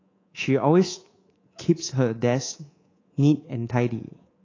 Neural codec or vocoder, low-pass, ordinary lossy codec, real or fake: codec, 24 kHz, 3.1 kbps, DualCodec; 7.2 kHz; AAC, 32 kbps; fake